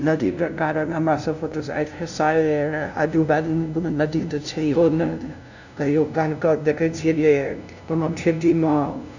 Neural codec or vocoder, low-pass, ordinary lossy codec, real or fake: codec, 16 kHz, 0.5 kbps, FunCodec, trained on LibriTTS, 25 frames a second; 7.2 kHz; none; fake